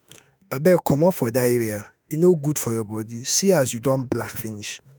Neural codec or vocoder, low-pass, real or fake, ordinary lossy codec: autoencoder, 48 kHz, 32 numbers a frame, DAC-VAE, trained on Japanese speech; none; fake; none